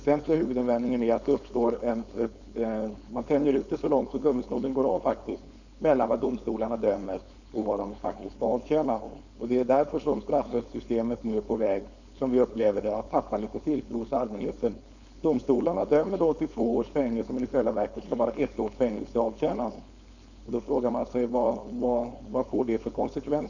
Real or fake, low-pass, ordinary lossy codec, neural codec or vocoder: fake; 7.2 kHz; none; codec, 16 kHz, 4.8 kbps, FACodec